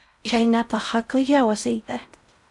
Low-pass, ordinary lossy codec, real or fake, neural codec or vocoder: 10.8 kHz; MP3, 96 kbps; fake; codec, 16 kHz in and 24 kHz out, 0.6 kbps, FocalCodec, streaming, 4096 codes